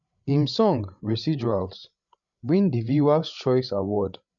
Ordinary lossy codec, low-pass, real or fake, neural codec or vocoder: none; 7.2 kHz; fake; codec, 16 kHz, 8 kbps, FreqCodec, larger model